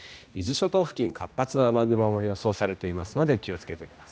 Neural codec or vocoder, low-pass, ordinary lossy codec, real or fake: codec, 16 kHz, 1 kbps, X-Codec, HuBERT features, trained on general audio; none; none; fake